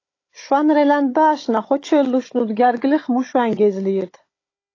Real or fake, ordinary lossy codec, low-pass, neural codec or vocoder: fake; AAC, 32 kbps; 7.2 kHz; codec, 16 kHz, 16 kbps, FunCodec, trained on Chinese and English, 50 frames a second